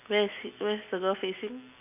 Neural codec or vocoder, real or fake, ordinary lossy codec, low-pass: none; real; none; 3.6 kHz